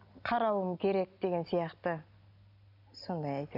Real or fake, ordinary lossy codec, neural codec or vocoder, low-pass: real; none; none; 5.4 kHz